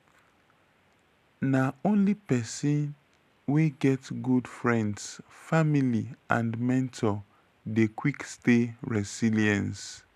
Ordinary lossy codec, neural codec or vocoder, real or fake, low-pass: none; none; real; 14.4 kHz